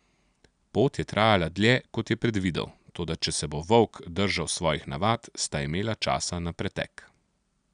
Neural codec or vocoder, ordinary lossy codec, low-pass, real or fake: none; none; 9.9 kHz; real